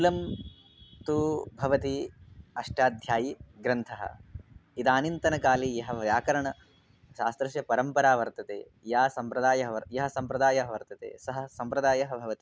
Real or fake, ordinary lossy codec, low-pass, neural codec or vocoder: real; none; none; none